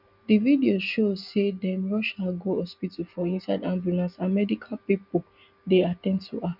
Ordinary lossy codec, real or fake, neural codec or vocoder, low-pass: none; real; none; 5.4 kHz